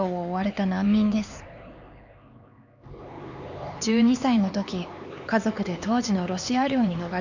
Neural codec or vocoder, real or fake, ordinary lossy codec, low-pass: codec, 16 kHz, 4 kbps, X-Codec, HuBERT features, trained on LibriSpeech; fake; none; 7.2 kHz